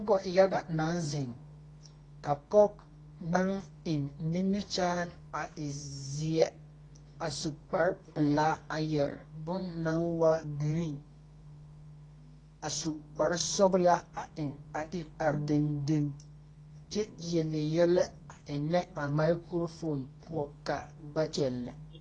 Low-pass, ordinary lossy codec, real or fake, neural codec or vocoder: 10.8 kHz; AAC, 32 kbps; fake; codec, 24 kHz, 0.9 kbps, WavTokenizer, medium music audio release